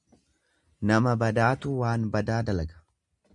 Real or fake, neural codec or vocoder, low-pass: real; none; 10.8 kHz